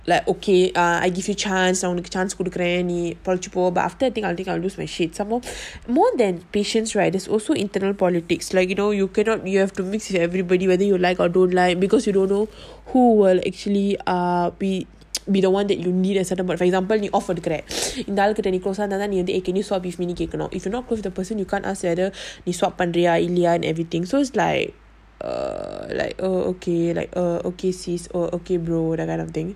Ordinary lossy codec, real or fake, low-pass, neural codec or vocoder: none; real; 14.4 kHz; none